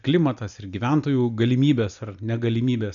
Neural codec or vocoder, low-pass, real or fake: none; 7.2 kHz; real